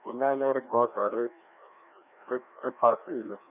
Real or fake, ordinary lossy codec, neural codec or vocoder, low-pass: fake; none; codec, 16 kHz, 1 kbps, FreqCodec, larger model; 3.6 kHz